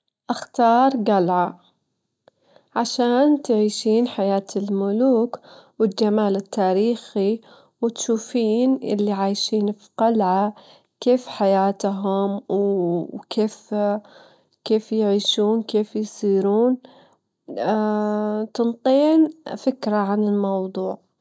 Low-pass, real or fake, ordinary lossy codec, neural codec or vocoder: none; real; none; none